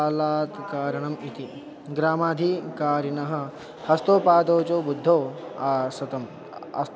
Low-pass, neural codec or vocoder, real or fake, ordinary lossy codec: none; none; real; none